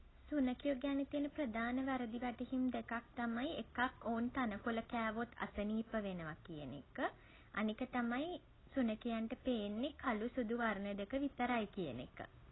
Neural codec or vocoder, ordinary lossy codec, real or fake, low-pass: none; AAC, 16 kbps; real; 7.2 kHz